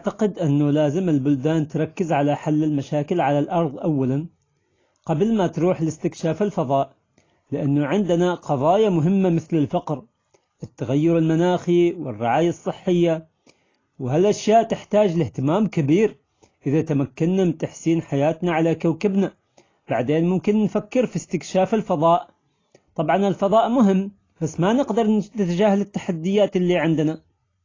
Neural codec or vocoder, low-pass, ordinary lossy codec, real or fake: none; 7.2 kHz; AAC, 32 kbps; real